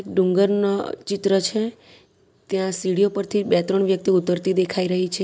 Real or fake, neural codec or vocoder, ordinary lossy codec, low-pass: real; none; none; none